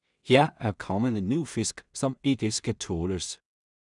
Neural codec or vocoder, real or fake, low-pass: codec, 16 kHz in and 24 kHz out, 0.4 kbps, LongCat-Audio-Codec, two codebook decoder; fake; 10.8 kHz